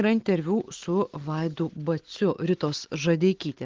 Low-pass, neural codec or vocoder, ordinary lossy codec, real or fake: 7.2 kHz; none; Opus, 16 kbps; real